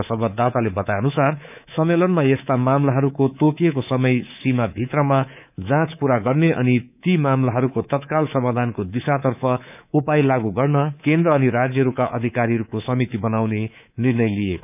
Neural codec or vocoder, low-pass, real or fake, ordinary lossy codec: codec, 24 kHz, 3.1 kbps, DualCodec; 3.6 kHz; fake; none